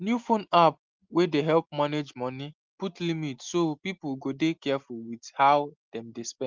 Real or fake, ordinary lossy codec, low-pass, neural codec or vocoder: real; Opus, 32 kbps; 7.2 kHz; none